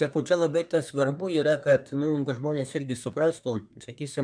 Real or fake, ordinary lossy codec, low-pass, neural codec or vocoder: fake; MP3, 96 kbps; 9.9 kHz; codec, 24 kHz, 1 kbps, SNAC